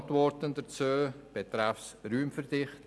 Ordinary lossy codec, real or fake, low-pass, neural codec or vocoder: none; real; none; none